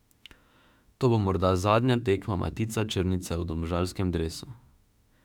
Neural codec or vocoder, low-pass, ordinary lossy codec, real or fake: autoencoder, 48 kHz, 32 numbers a frame, DAC-VAE, trained on Japanese speech; 19.8 kHz; none; fake